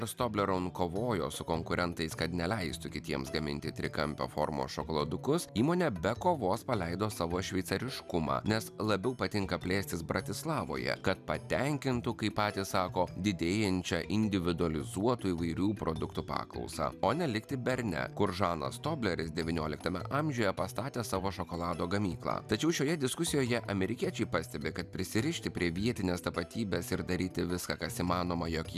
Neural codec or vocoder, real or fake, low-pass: none; real; 14.4 kHz